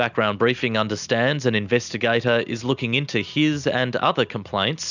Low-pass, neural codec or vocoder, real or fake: 7.2 kHz; none; real